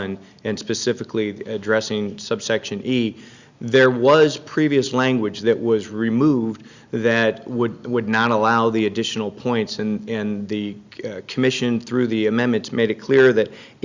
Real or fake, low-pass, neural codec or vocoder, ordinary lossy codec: real; 7.2 kHz; none; Opus, 64 kbps